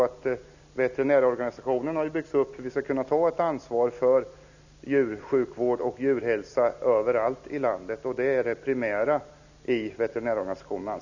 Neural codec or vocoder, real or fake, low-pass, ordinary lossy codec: none; real; 7.2 kHz; none